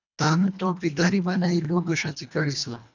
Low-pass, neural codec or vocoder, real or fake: 7.2 kHz; codec, 24 kHz, 1.5 kbps, HILCodec; fake